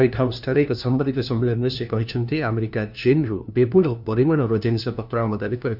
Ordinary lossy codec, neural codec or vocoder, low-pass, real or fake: none; codec, 16 kHz, 1 kbps, FunCodec, trained on LibriTTS, 50 frames a second; 5.4 kHz; fake